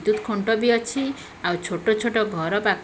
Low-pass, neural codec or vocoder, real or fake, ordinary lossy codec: none; none; real; none